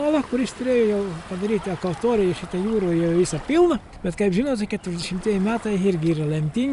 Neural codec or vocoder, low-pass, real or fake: none; 10.8 kHz; real